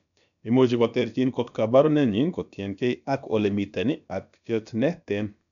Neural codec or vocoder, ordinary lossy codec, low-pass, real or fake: codec, 16 kHz, about 1 kbps, DyCAST, with the encoder's durations; none; 7.2 kHz; fake